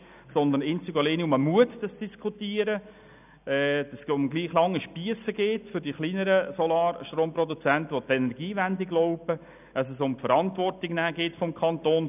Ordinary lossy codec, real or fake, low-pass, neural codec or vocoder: none; real; 3.6 kHz; none